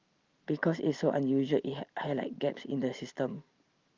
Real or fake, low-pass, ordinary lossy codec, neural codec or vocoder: real; 7.2 kHz; Opus, 32 kbps; none